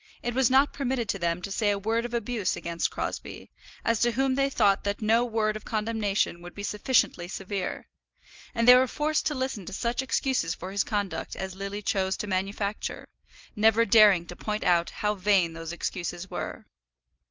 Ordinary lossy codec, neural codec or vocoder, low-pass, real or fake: Opus, 24 kbps; none; 7.2 kHz; real